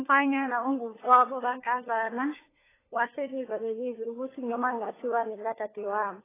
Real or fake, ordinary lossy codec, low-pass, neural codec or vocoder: fake; AAC, 16 kbps; 3.6 kHz; codec, 16 kHz, 4 kbps, FunCodec, trained on LibriTTS, 50 frames a second